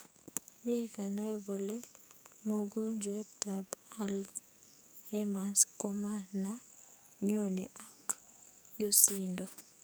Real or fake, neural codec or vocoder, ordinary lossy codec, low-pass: fake; codec, 44.1 kHz, 2.6 kbps, SNAC; none; none